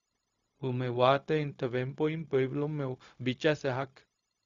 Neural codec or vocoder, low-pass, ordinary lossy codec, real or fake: codec, 16 kHz, 0.4 kbps, LongCat-Audio-Codec; 7.2 kHz; Opus, 64 kbps; fake